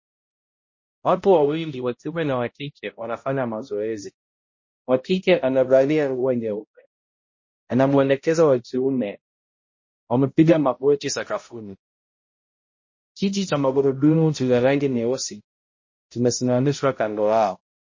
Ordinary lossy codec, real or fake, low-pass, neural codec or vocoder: MP3, 32 kbps; fake; 7.2 kHz; codec, 16 kHz, 0.5 kbps, X-Codec, HuBERT features, trained on balanced general audio